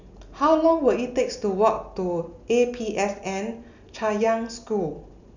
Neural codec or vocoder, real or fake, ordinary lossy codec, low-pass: none; real; none; 7.2 kHz